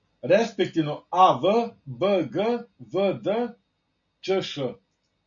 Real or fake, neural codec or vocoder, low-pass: real; none; 7.2 kHz